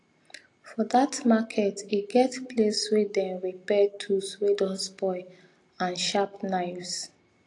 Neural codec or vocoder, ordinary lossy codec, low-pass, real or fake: none; AAC, 48 kbps; 10.8 kHz; real